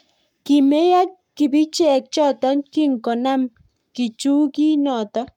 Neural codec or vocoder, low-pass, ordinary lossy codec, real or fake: codec, 44.1 kHz, 7.8 kbps, Pupu-Codec; 19.8 kHz; MP3, 96 kbps; fake